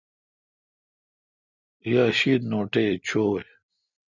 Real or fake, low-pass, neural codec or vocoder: real; 7.2 kHz; none